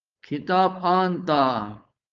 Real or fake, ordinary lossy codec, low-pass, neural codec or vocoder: fake; Opus, 24 kbps; 7.2 kHz; codec, 16 kHz, 4.8 kbps, FACodec